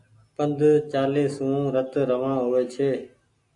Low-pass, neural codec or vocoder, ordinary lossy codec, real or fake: 10.8 kHz; none; AAC, 64 kbps; real